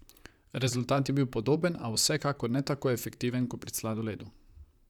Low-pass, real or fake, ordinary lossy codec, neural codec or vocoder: 19.8 kHz; fake; none; vocoder, 44.1 kHz, 128 mel bands, Pupu-Vocoder